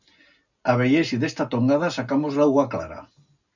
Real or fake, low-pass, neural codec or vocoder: real; 7.2 kHz; none